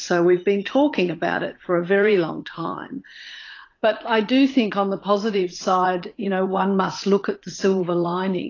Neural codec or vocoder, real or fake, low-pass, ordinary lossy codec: vocoder, 44.1 kHz, 80 mel bands, Vocos; fake; 7.2 kHz; AAC, 32 kbps